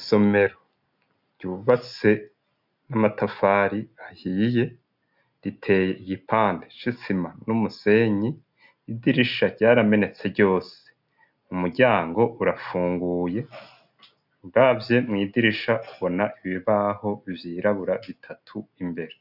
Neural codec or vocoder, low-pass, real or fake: none; 5.4 kHz; real